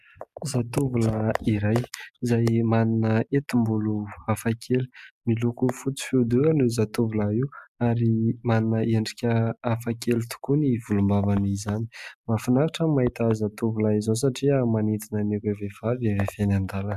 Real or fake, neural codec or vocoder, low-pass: real; none; 14.4 kHz